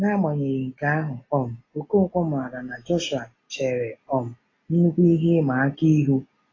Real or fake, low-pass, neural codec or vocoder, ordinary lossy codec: real; 7.2 kHz; none; AAC, 32 kbps